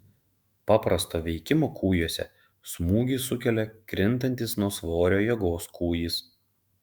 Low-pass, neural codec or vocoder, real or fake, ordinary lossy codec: 19.8 kHz; autoencoder, 48 kHz, 128 numbers a frame, DAC-VAE, trained on Japanese speech; fake; Opus, 64 kbps